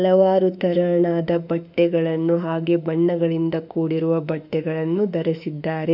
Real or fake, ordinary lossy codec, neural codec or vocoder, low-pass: fake; none; autoencoder, 48 kHz, 32 numbers a frame, DAC-VAE, trained on Japanese speech; 5.4 kHz